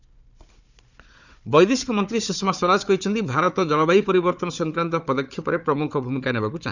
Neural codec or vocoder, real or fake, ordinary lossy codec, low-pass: codec, 16 kHz, 4 kbps, FunCodec, trained on Chinese and English, 50 frames a second; fake; none; 7.2 kHz